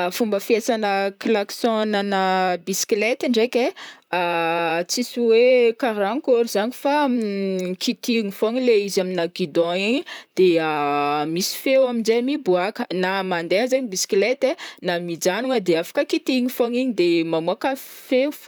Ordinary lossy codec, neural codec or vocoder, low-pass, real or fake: none; vocoder, 44.1 kHz, 128 mel bands, Pupu-Vocoder; none; fake